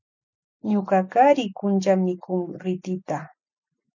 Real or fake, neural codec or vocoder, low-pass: real; none; 7.2 kHz